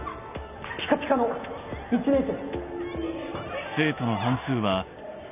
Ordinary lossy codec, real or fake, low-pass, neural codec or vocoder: none; real; 3.6 kHz; none